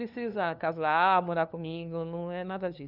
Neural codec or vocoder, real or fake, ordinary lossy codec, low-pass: codec, 16 kHz, 2 kbps, FunCodec, trained on LibriTTS, 25 frames a second; fake; none; 5.4 kHz